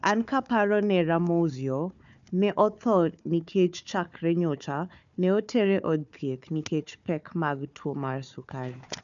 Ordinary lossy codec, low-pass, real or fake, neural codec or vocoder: none; 7.2 kHz; fake; codec, 16 kHz, 4 kbps, FunCodec, trained on Chinese and English, 50 frames a second